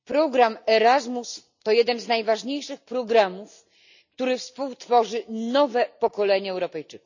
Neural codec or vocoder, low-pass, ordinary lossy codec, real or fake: none; 7.2 kHz; none; real